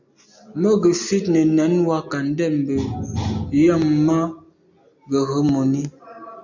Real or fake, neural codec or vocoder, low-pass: real; none; 7.2 kHz